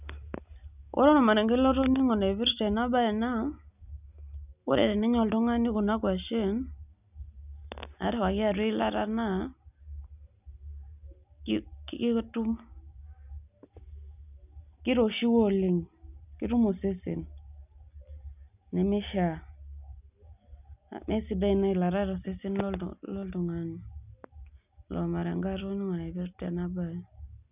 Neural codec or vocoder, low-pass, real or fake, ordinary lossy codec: none; 3.6 kHz; real; none